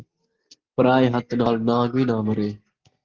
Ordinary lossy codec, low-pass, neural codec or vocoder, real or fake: Opus, 16 kbps; 7.2 kHz; none; real